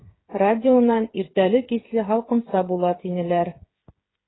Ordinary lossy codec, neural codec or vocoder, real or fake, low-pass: AAC, 16 kbps; codec, 16 kHz, 8 kbps, FreqCodec, smaller model; fake; 7.2 kHz